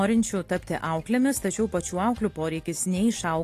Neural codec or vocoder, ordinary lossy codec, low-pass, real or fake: none; AAC, 48 kbps; 14.4 kHz; real